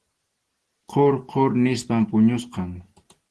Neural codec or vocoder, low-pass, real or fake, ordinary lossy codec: none; 10.8 kHz; real; Opus, 16 kbps